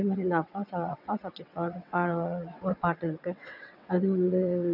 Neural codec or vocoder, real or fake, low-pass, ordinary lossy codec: vocoder, 22.05 kHz, 80 mel bands, WaveNeXt; fake; 5.4 kHz; none